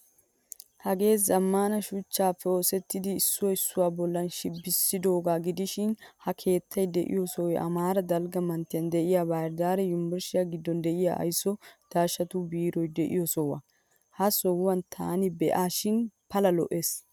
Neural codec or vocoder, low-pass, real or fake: none; 19.8 kHz; real